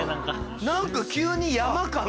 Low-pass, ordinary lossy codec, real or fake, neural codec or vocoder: none; none; real; none